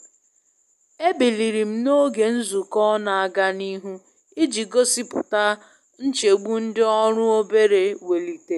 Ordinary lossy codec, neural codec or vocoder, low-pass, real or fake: none; none; 14.4 kHz; real